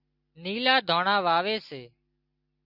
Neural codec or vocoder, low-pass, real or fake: none; 5.4 kHz; real